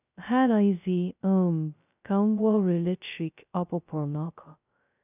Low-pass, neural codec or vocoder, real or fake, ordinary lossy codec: 3.6 kHz; codec, 16 kHz, 0.2 kbps, FocalCodec; fake; none